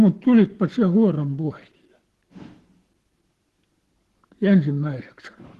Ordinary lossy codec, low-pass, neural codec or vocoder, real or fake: Opus, 16 kbps; 14.4 kHz; codec, 44.1 kHz, 7.8 kbps, Pupu-Codec; fake